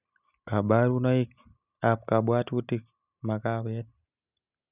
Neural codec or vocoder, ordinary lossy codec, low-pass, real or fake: none; none; 3.6 kHz; real